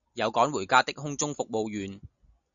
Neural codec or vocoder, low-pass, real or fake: none; 7.2 kHz; real